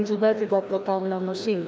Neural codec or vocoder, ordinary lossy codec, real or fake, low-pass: codec, 16 kHz, 1 kbps, FreqCodec, larger model; none; fake; none